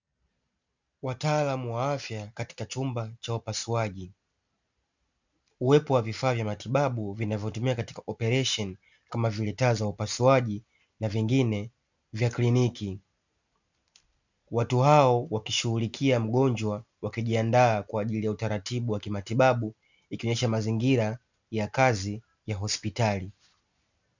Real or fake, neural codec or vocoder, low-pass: real; none; 7.2 kHz